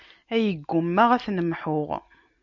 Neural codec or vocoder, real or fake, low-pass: none; real; 7.2 kHz